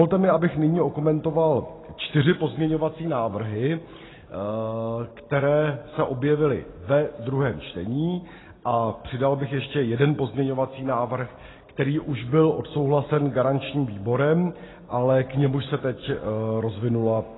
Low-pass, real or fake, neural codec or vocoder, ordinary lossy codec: 7.2 kHz; real; none; AAC, 16 kbps